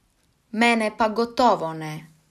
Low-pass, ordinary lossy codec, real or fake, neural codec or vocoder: 14.4 kHz; none; real; none